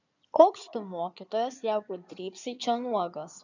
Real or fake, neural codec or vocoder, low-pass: fake; codec, 16 kHz in and 24 kHz out, 2.2 kbps, FireRedTTS-2 codec; 7.2 kHz